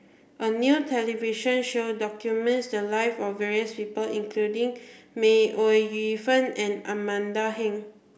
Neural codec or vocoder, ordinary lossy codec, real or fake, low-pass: none; none; real; none